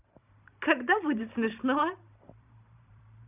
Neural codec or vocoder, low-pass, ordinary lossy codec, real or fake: vocoder, 44.1 kHz, 128 mel bands every 512 samples, BigVGAN v2; 3.6 kHz; none; fake